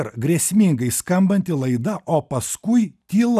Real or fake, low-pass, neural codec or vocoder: real; 14.4 kHz; none